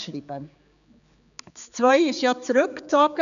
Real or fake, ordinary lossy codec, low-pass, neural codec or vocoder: fake; none; 7.2 kHz; codec, 16 kHz, 4 kbps, X-Codec, HuBERT features, trained on general audio